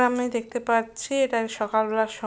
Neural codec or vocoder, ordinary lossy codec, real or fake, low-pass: none; none; real; none